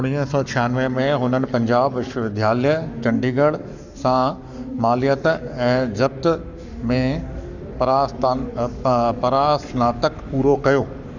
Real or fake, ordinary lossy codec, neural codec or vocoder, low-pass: fake; none; codec, 44.1 kHz, 7.8 kbps, Pupu-Codec; 7.2 kHz